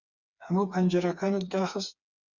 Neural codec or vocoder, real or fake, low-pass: codec, 16 kHz, 4 kbps, FreqCodec, smaller model; fake; 7.2 kHz